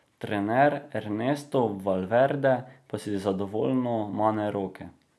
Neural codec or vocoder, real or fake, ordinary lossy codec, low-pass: none; real; none; none